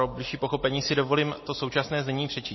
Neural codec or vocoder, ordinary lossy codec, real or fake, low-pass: none; MP3, 24 kbps; real; 7.2 kHz